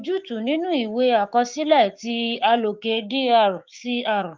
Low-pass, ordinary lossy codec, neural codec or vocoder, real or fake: 7.2 kHz; Opus, 24 kbps; codec, 16 kHz, 6 kbps, DAC; fake